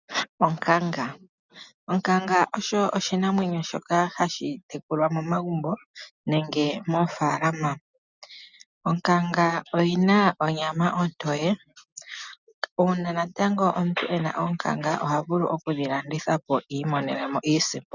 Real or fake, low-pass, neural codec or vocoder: real; 7.2 kHz; none